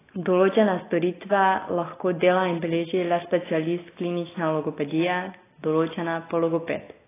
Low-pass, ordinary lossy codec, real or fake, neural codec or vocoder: 3.6 kHz; AAC, 16 kbps; real; none